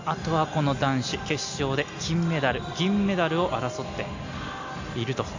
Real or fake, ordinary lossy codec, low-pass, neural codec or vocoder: real; AAC, 48 kbps; 7.2 kHz; none